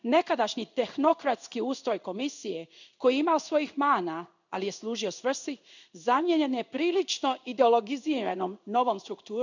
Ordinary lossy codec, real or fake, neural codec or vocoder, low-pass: none; fake; codec, 16 kHz in and 24 kHz out, 1 kbps, XY-Tokenizer; 7.2 kHz